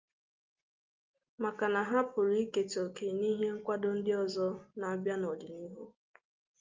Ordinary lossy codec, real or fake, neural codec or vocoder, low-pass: Opus, 24 kbps; real; none; 7.2 kHz